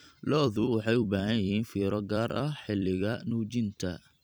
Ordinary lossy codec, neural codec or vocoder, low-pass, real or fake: none; vocoder, 44.1 kHz, 128 mel bands every 256 samples, BigVGAN v2; none; fake